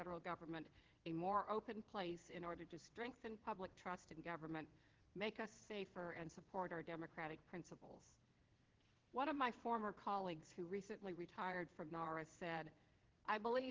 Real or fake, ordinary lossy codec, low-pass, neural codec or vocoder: fake; Opus, 16 kbps; 7.2 kHz; vocoder, 22.05 kHz, 80 mel bands, WaveNeXt